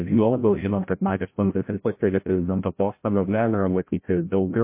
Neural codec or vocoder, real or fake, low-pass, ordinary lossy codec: codec, 16 kHz, 0.5 kbps, FreqCodec, larger model; fake; 3.6 kHz; MP3, 24 kbps